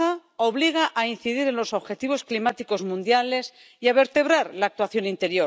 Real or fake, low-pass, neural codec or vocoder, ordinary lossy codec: real; none; none; none